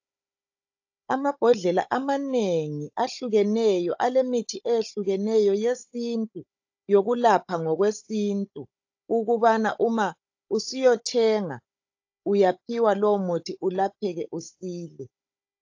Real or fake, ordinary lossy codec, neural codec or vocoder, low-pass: fake; AAC, 48 kbps; codec, 16 kHz, 16 kbps, FunCodec, trained on Chinese and English, 50 frames a second; 7.2 kHz